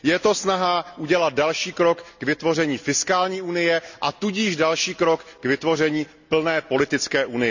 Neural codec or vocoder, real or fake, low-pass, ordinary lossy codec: none; real; 7.2 kHz; none